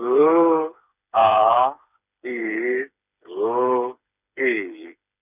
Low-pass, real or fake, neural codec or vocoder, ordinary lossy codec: 3.6 kHz; fake; codec, 16 kHz, 4 kbps, FreqCodec, smaller model; none